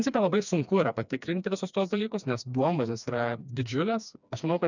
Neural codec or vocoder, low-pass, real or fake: codec, 16 kHz, 2 kbps, FreqCodec, smaller model; 7.2 kHz; fake